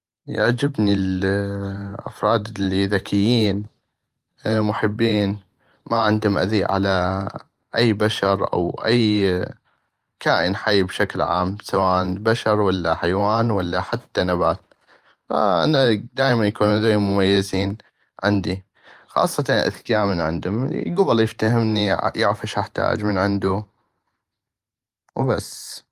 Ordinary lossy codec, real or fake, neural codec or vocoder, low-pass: Opus, 32 kbps; fake; vocoder, 44.1 kHz, 128 mel bands every 512 samples, BigVGAN v2; 14.4 kHz